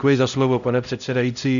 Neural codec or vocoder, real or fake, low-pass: codec, 16 kHz, 0.5 kbps, X-Codec, HuBERT features, trained on LibriSpeech; fake; 7.2 kHz